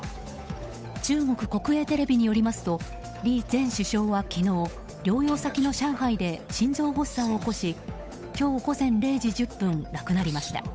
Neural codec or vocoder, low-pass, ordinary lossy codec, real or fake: codec, 16 kHz, 8 kbps, FunCodec, trained on Chinese and English, 25 frames a second; none; none; fake